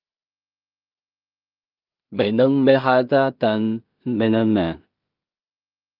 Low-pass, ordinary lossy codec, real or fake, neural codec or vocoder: 5.4 kHz; Opus, 24 kbps; fake; codec, 16 kHz in and 24 kHz out, 0.4 kbps, LongCat-Audio-Codec, two codebook decoder